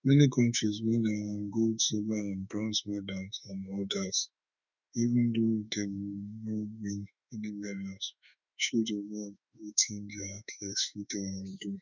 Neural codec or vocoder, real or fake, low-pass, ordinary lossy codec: autoencoder, 48 kHz, 32 numbers a frame, DAC-VAE, trained on Japanese speech; fake; 7.2 kHz; none